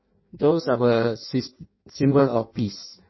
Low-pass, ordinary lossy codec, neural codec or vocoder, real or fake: 7.2 kHz; MP3, 24 kbps; codec, 16 kHz in and 24 kHz out, 0.6 kbps, FireRedTTS-2 codec; fake